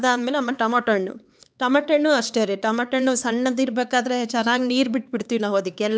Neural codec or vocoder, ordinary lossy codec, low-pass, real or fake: codec, 16 kHz, 4 kbps, X-Codec, HuBERT features, trained on LibriSpeech; none; none; fake